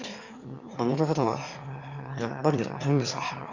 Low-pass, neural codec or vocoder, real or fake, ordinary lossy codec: 7.2 kHz; autoencoder, 22.05 kHz, a latent of 192 numbers a frame, VITS, trained on one speaker; fake; Opus, 64 kbps